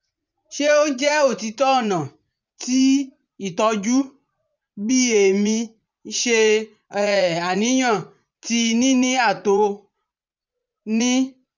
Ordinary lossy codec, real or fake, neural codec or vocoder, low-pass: none; fake; vocoder, 44.1 kHz, 80 mel bands, Vocos; 7.2 kHz